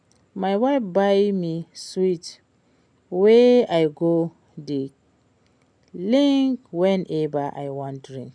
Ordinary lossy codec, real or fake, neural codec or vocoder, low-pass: none; real; none; 9.9 kHz